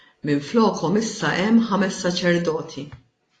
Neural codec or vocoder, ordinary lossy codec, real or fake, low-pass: none; AAC, 32 kbps; real; 9.9 kHz